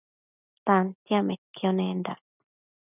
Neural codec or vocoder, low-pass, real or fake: none; 3.6 kHz; real